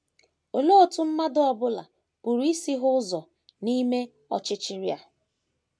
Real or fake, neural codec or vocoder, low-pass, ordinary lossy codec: real; none; none; none